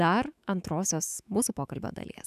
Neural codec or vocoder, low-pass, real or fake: autoencoder, 48 kHz, 128 numbers a frame, DAC-VAE, trained on Japanese speech; 14.4 kHz; fake